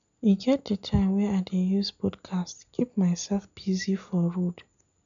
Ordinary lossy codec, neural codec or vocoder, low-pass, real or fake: none; none; 7.2 kHz; real